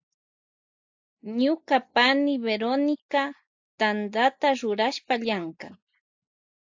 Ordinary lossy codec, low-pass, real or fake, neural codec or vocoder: AAC, 48 kbps; 7.2 kHz; real; none